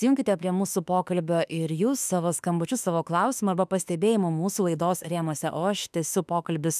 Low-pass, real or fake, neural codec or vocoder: 14.4 kHz; fake; autoencoder, 48 kHz, 32 numbers a frame, DAC-VAE, trained on Japanese speech